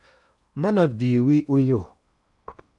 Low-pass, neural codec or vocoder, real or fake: 10.8 kHz; codec, 16 kHz in and 24 kHz out, 0.8 kbps, FocalCodec, streaming, 65536 codes; fake